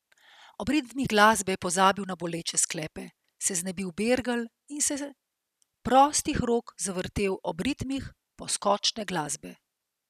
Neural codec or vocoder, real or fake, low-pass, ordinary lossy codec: none; real; 14.4 kHz; none